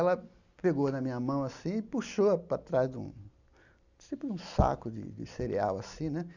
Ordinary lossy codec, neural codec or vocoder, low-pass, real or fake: none; none; 7.2 kHz; real